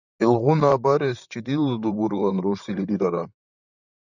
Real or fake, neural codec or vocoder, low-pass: fake; vocoder, 44.1 kHz, 128 mel bands, Pupu-Vocoder; 7.2 kHz